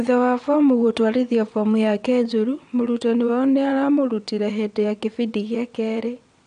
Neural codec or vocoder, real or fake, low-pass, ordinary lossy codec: vocoder, 22.05 kHz, 80 mel bands, WaveNeXt; fake; 9.9 kHz; none